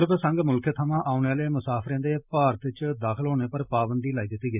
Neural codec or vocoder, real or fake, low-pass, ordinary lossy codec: none; real; 3.6 kHz; none